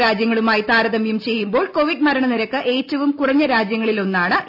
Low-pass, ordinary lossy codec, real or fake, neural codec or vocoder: 5.4 kHz; MP3, 48 kbps; real; none